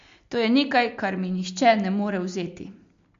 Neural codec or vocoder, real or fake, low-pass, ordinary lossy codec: none; real; 7.2 kHz; MP3, 48 kbps